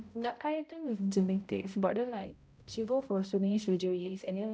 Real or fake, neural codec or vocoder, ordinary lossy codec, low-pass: fake; codec, 16 kHz, 0.5 kbps, X-Codec, HuBERT features, trained on balanced general audio; none; none